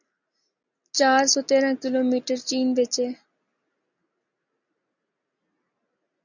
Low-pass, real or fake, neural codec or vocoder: 7.2 kHz; real; none